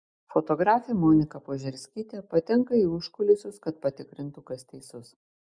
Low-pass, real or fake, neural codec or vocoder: 9.9 kHz; real; none